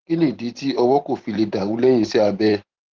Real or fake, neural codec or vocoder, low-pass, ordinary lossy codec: fake; vocoder, 44.1 kHz, 128 mel bands every 512 samples, BigVGAN v2; 7.2 kHz; Opus, 16 kbps